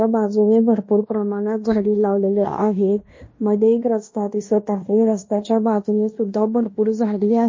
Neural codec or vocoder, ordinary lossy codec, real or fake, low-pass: codec, 16 kHz in and 24 kHz out, 0.9 kbps, LongCat-Audio-Codec, fine tuned four codebook decoder; MP3, 32 kbps; fake; 7.2 kHz